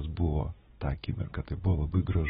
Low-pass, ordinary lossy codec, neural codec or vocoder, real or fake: 7.2 kHz; AAC, 16 kbps; none; real